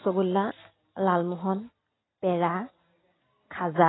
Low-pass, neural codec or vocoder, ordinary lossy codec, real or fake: 7.2 kHz; none; AAC, 16 kbps; real